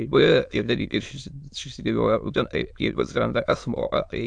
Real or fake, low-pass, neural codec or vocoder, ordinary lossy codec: fake; 9.9 kHz; autoencoder, 22.05 kHz, a latent of 192 numbers a frame, VITS, trained on many speakers; AAC, 64 kbps